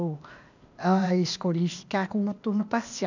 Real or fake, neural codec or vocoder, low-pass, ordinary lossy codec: fake; codec, 16 kHz, 0.8 kbps, ZipCodec; 7.2 kHz; none